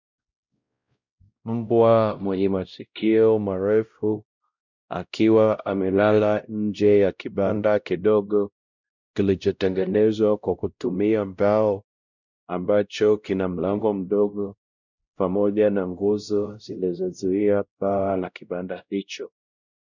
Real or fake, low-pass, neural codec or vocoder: fake; 7.2 kHz; codec, 16 kHz, 0.5 kbps, X-Codec, WavLM features, trained on Multilingual LibriSpeech